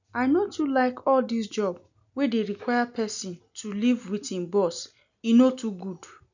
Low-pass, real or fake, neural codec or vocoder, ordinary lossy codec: 7.2 kHz; real; none; none